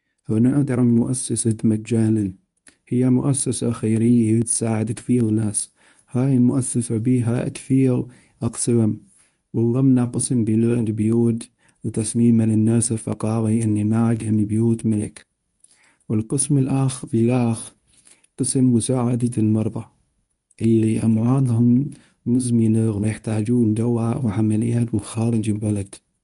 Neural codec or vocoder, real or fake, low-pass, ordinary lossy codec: codec, 24 kHz, 0.9 kbps, WavTokenizer, medium speech release version 1; fake; 10.8 kHz; none